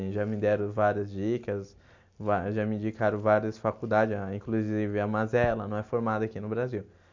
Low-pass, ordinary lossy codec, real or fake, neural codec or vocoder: 7.2 kHz; MP3, 48 kbps; real; none